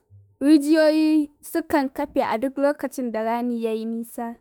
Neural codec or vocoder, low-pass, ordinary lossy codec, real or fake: autoencoder, 48 kHz, 32 numbers a frame, DAC-VAE, trained on Japanese speech; none; none; fake